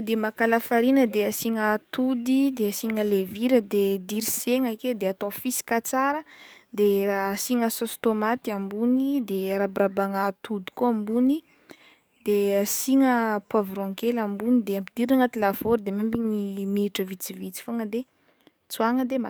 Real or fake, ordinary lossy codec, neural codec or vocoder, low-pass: fake; none; codec, 44.1 kHz, 7.8 kbps, DAC; none